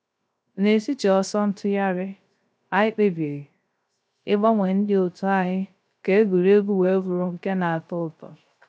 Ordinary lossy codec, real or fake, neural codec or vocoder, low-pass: none; fake; codec, 16 kHz, 0.3 kbps, FocalCodec; none